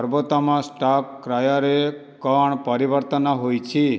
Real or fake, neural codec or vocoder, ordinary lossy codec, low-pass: real; none; none; none